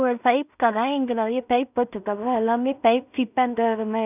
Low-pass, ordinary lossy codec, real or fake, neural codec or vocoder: 3.6 kHz; none; fake; codec, 16 kHz in and 24 kHz out, 0.4 kbps, LongCat-Audio-Codec, two codebook decoder